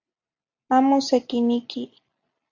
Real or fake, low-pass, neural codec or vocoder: real; 7.2 kHz; none